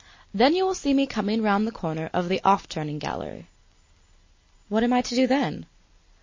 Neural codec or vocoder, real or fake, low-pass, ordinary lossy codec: none; real; 7.2 kHz; MP3, 32 kbps